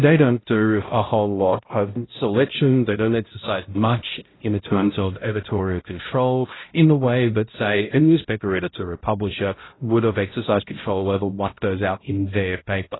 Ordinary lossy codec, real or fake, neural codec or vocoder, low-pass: AAC, 16 kbps; fake; codec, 16 kHz, 0.5 kbps, X-Codec, HuBERT features, trained on balanced general audio; 7.2 kHz